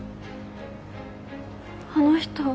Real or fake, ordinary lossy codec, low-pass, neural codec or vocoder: real; none; none; none